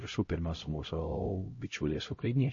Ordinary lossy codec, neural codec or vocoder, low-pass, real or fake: MP3, 32 kbps; codec, 16 kHz, 0.5 kbps, X-Codec, HuBERT features, trained on LibriSpeech; 7.2 kHz; fake